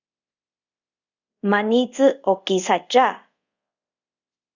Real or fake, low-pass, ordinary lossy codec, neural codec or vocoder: fake; 7.2 kHz; Opus, 64 kbps; codec, 24 kHz, 0.5 kbps, DualCodec